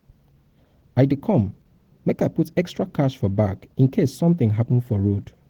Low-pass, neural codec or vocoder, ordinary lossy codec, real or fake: 19.8 kHz; none; Opus, 16 kbps; real